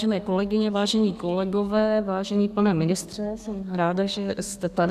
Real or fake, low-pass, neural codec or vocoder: fake; 14.4 kHz; codec, 32 kHz, 1.9 kbps, SNAC